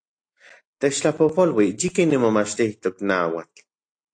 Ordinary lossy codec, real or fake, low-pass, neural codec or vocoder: AAC, 48 kbps; real; 9.9 kHz; none